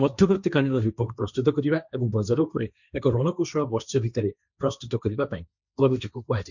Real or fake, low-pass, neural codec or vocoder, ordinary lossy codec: fake; 7.2 kHz; codec, 16 kHz, 1.1 kbps, Voila-Tokenizer; none